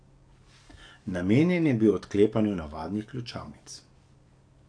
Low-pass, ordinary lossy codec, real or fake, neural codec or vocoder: 9.9 kHz; AAC, 48 kbps; fake; codec, 44.1 kHz, 7.8 kbps, DAC